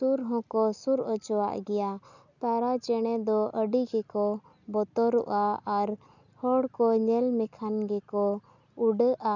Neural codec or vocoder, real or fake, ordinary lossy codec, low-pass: none; real; none; 7.2 kHz